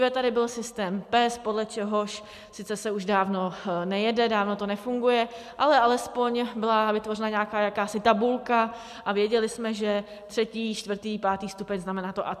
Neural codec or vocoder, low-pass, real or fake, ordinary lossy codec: none; 14.4 kHz; real; MP3, 96 kbps